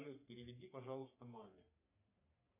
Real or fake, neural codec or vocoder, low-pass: fake; codec, 44.1 kHz, 3.4 kbps, Pupu-Codec; 3.6 kHz